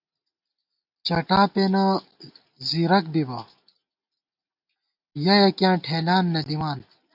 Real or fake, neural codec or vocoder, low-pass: real; none; 5.4 kHz